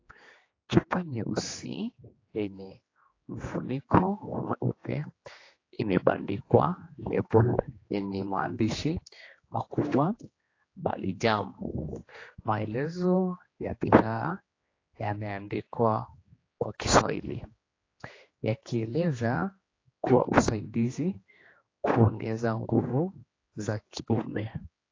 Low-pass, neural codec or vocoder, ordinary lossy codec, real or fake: 7.2 kHz; codec, 16 kHz, 2 kbps, X-Codec, HuBERT features, trained on general audio; AAC, 32 kbps; fake